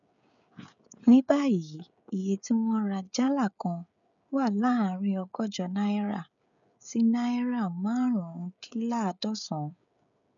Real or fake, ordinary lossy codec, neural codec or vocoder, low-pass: fake; none; codec, 16 kHz, 16 kbps, FreqCodec, smaller model; 7.2 kHz